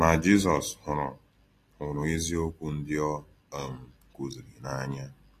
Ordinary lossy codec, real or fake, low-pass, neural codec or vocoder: AAC, 48 kbps; real; 14.4 kHz; none